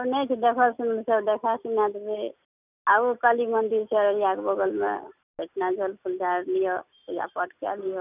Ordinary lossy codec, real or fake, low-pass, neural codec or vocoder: none; real; 3.6 kHz; none